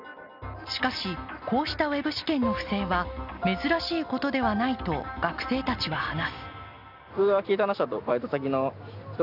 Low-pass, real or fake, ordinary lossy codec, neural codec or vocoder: 5.4 kHz; real; none; none